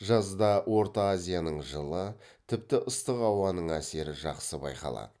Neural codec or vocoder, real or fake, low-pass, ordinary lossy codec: none; real; none; none